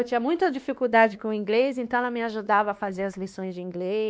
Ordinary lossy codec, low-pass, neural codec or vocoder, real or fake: none; none; codec, 16 kHz, 2 kbps, X-Codec, WavLM features, trained on Multilingual LibriSpeech; fake